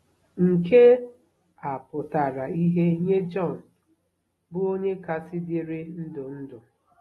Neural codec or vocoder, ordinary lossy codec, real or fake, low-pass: none; AAC, 32 kbps; real; 19.8 kHz